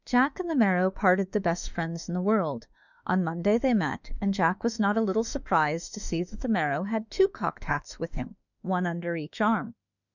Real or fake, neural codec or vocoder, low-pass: fake; autoencoder, 48 kHz, 32 numbers a frame, DAC-VAE, trained on Japanese speech; 7.2 kHz